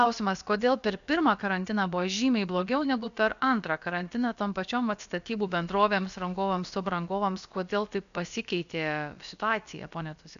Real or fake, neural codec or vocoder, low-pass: fake; codec, 16 kHz, about 1 kbps, DyCAST, with the encoder's durations; 7.2 kHz